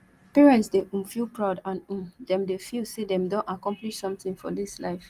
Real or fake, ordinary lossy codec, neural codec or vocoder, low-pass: real; Opus, 24 kbps; none; 14.4 kHz